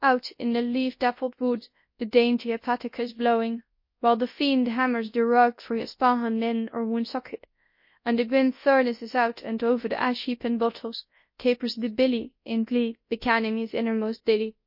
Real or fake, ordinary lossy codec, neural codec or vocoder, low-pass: fake; MP3, 32 kbps; codec, 24 kHz, 0.9 kbps, WavTokenizer, large speech release; 5.4 kHz